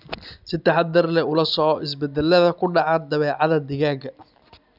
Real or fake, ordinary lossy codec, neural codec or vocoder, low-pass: real; none; none; 5.4 kHz